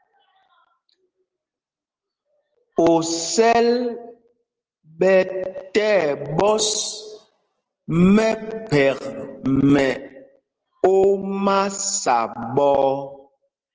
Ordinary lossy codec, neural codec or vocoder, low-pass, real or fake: Opus, 16 kbps; none; 7.2 kHz; real